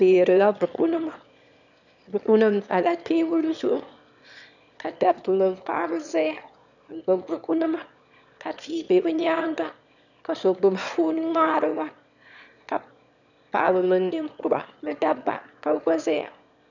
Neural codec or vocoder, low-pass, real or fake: autoencoder, 22.05 kHz, a latent of 192 numbers a frame, VITS, trained on one speaker; 7.2 kHz; fake